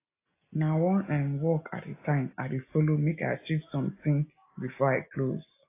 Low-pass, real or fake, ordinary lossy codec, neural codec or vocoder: 3.6 kHz; real; AAC, 24 kbps; none